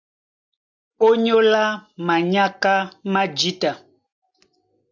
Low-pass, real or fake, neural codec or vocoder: 7.2 kHz; real; none